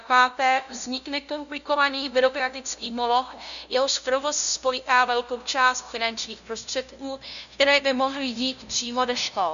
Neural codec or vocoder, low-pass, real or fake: codec, 16 kHz, 0.5 kbps, FunCodec, trained on LibriTTS, 25 frames a second; 7.2 kHz; fake